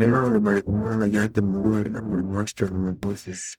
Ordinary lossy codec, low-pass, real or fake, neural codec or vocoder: none; 19.8 kHz; fake; codec, 44.1 kHz, 0.9 kbps, DAC